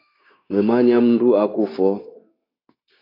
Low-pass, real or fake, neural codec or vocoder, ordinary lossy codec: 5.4 kHz; fake; codec, 16 kHz, 0.9 kbps, LongCat-Audio-Codec; AAC, 24 kbps